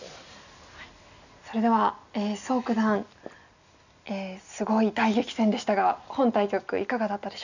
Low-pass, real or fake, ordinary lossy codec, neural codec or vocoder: 7.2 kHz; real; none; none